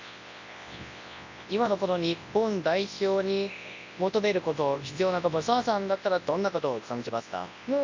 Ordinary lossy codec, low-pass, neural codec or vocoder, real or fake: MP3, 64 kbps; 7.2 kHz; codec, 24 kHz, 0.9 kbps, WavTokenizer, large speech release; fake